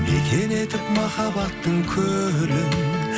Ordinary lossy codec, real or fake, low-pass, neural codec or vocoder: none; real; none; none